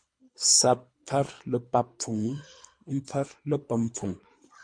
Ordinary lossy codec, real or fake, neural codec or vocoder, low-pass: MP3, 48 kbps; fake; codec, 24 kHz, 3 kbps, HILCodec; 9.9 kHz